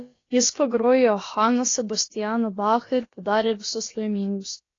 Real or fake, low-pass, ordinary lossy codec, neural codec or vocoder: fake; 7.2 kHz; AAC, 32 kbps; codec, 16 kHz, about 1 kbps, DyCAST, with the encoder's durations